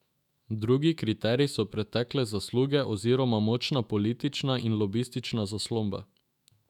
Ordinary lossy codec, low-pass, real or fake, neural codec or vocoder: none; 19.8 kHz; fake; autoencoder, 48 kHz, 128 numbers a frame, DAC-VAE, trained on Japanese speech